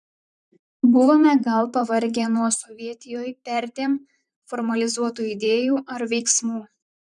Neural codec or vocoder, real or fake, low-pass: vocoder, 44.1 kHz, 128 mel bands, Pupu-Vocoder; fake; 10.8 kHz